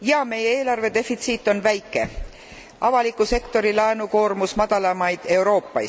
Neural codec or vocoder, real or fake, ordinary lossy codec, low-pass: none; real; none; none